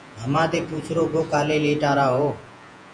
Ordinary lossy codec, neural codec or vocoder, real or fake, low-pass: MP3, 48 kbps; vocoder, 48 kHz, 128 mel bands, Vocos; fake; 9.9 kHz